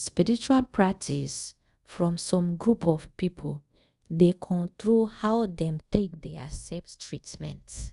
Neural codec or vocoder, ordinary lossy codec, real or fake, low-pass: codec, 24 kHz, 0.5 kbps, DualCodec; Opus, 64 kbps; fake; 10.8 kHz